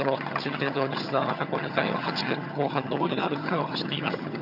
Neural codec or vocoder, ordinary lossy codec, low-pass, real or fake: vocoder, 22.05 kHz, 80 mel bands, HiFi-GAN; none; 5.4 kHz; fake